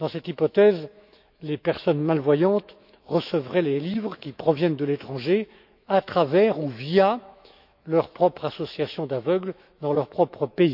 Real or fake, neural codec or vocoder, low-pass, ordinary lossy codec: fake; autoencoder, 48 kHz, 128 numbers a frame, DAC-VAE, trained on Japanese speech; 5.4 kHz; none